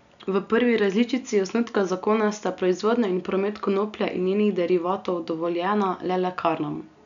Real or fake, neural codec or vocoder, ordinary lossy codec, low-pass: real; none; none; 7.2 kHz